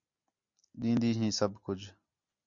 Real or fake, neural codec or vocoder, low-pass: real; none; 7.2 kHz